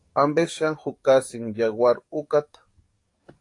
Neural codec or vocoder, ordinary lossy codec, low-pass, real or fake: codec, 44.1 kHz, 7.8 kbps, DAC; AAC, 48 kbps; 10.8 kHz; fake